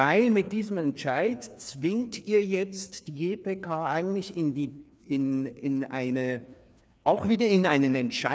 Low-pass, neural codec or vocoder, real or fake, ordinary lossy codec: none; codec, 16 kHz, 2 kbps, FreqCodec, larger model; fake; none